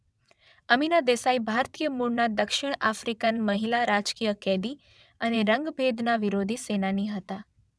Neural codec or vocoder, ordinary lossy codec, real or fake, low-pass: vocoder, 22.05 kHz, 80 mel bands, WaveNeXt; none; fake; none